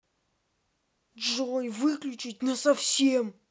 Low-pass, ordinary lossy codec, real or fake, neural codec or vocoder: none; none; real; none